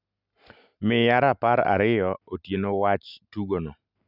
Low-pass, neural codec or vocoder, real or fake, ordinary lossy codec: 5.4 kHz; none; real; none